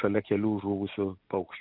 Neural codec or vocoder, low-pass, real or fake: none; 5.4 kHz; real